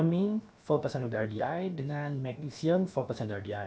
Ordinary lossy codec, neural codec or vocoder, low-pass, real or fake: none; codec, 16 kHz, about 1 kbps, DyCAST, with the encoder's durations; none; fake